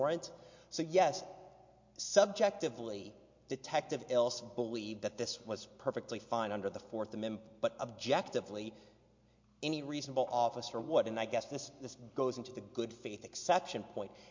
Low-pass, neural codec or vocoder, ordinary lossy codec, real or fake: 7.2 kHz; none; MP3, 48 kbps; real